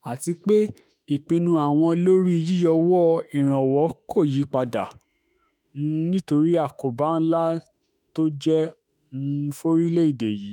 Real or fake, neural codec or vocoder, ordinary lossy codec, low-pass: fake; autoencoder, 48 kHz, 32 numbers a frame, DAC-VAE, trained on Japanese speech; none; 19.8 kHz